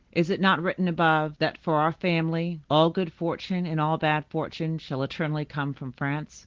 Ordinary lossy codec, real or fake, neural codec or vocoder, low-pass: Opus, 16 kbps; real; none; 7.2 kHz